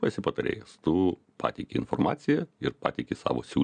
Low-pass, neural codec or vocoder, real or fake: 9.9 kHz; none; real